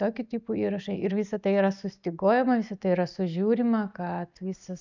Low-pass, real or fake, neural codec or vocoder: 7.2 kHz; real; none